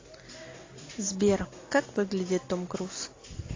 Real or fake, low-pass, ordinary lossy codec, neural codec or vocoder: real; 7.2 kHz; AAC, 32 kbps; none